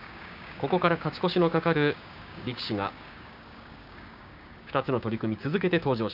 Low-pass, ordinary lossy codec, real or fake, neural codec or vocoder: 5.4 kHz; none; fake; codec, 16 kHz, 6 kbps, DAC